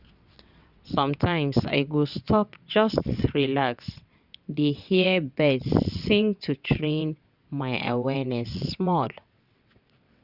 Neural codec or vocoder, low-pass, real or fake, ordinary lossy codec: vocoder, 22.05 kHz, 80 mel bands, WaveNeXt; 5.4 kHz; fake; Opus, 64 kbps